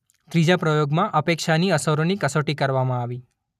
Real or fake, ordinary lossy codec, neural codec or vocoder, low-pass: real; none; none; 14.4 kHz